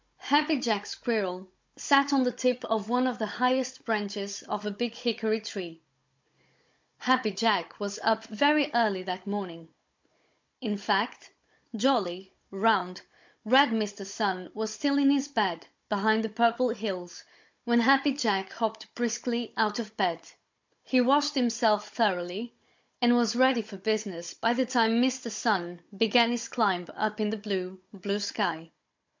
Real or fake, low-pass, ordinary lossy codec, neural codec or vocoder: fake; 7.2 kHz; MP3, 48 kbps; codec, 16 kHz, 16 kbps, FunCodec, trained on Chinese and English, 50 frames a second